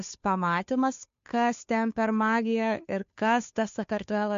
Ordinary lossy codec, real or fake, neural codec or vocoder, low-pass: MP3, 48 kbps; fake; codec, 16 kHz, 2 kbps, FunCodec, trained on Chinese and English, 25 frames a second; 7.2 kHz